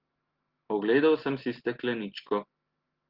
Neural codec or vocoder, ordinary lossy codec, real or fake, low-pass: none; Opus, 16 kbps; real; 5.4 kHz